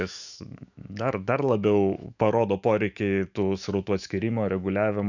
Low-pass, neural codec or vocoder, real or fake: 7.2 kHz; autoencoder, 48 kHz, 128 numbers a frame, DAC-VAE, trained on Japanese speech; fake